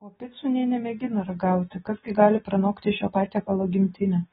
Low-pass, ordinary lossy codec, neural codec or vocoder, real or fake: 10.8 kHz; AAC, 16 kbps; none; real